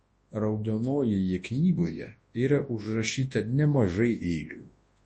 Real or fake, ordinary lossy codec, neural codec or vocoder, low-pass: fake; MP3, 32 kbps; codec, 24 kHz, 0.9 kbps, WavTokenizer, large speech release; 10.8 kHz